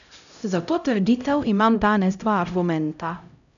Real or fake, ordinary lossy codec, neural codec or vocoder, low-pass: fake; none; codec, 16 kHz, 0.5 kbps, X-Codec, HuBERT features, trained on LibriSpeech; 7.2 kHz